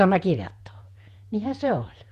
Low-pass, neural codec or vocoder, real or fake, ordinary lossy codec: 14.4 kHz; vocoder, 48 kHz, 128 mel bands, Vocos; fake; Opus, 64 kbps